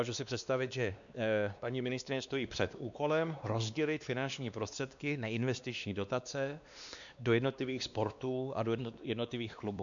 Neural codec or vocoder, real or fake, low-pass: codec, 16 kHz, 2 kbps, X-Codec, WavLM features, trained on Multilingual LibriSpeech; fake; 7.2 kHz